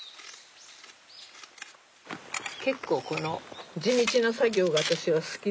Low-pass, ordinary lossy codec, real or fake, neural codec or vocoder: none; none; real; none